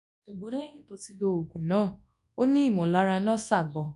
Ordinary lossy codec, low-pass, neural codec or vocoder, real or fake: AAC, 96 kbps; 10.8 kHz; codec, 24 kHz, 0.9 kbps, WavTokenizer, large speech release; fake